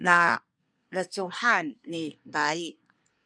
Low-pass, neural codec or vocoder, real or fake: 9.9 kHz; codec, 24 kHz, 1 kbps, SNAC; fake